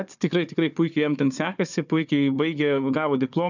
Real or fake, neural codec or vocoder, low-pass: fake; codec, 16 kHz, 4 kbps, FunCodec, trained on Chinese and English, 50 frames a second; 7.2 kHz